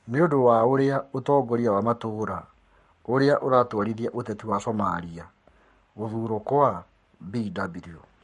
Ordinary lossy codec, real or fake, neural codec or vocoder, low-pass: MP3, 48 kbps; fake; codec, 44.1 kHz, 7.8 kbps, Pupu-Codec; 14.4 kHz